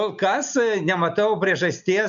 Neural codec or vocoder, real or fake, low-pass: none; real; 7.2 kHz